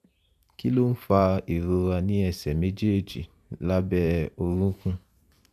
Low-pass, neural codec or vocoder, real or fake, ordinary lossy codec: 14.4 kHz; vocoder, 44.1 kHz, 128 mel bands every 512 samples, BigVGAN v2; fake; none